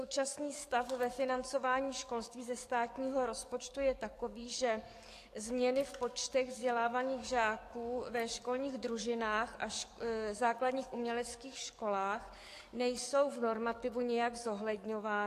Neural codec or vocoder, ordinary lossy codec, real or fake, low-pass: codec, 44.1 kHz, 7.8 kbps, DAC; AAC, 64 kbps; fake; 14.4 kHz